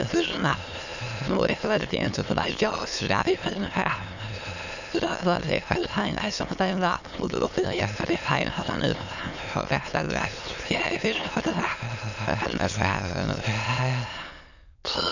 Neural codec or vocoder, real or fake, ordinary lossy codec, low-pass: autoencoder, 22.05 kHz, a latent of 192 numbers a frame, VITS, trained on many speakers; fake; none; 7.2 kHz